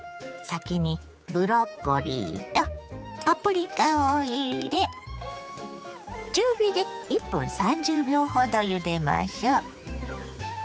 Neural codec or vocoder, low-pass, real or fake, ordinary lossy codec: codec, 16 kHz, 4 kbps, X-Codec, HuBERT features, trained on general audio; none; fake; none